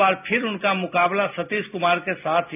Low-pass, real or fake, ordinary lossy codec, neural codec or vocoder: 3.6 kHz; real; none; none